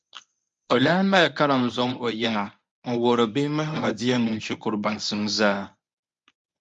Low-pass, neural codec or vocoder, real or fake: 10.8 kHz; codec, 24 kHz, 0.9 kbps, WavTokenizer, medium speech release version 1; fake